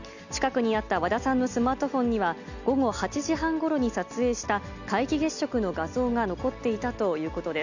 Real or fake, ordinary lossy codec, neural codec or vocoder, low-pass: real; none; none; 7.2 kHz